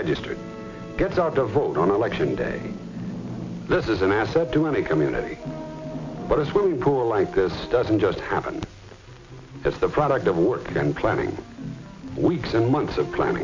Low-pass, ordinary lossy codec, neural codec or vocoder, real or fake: 7.2 kHz; MP3, 64 kbps; none; real